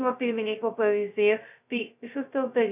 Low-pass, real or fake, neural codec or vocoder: 3.6 kHz; fake; codec, 16 kHz, 0.2 kbps, FocalCodec